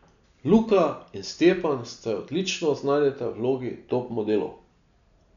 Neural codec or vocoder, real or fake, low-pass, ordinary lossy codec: none; real; 7.2 kHz; none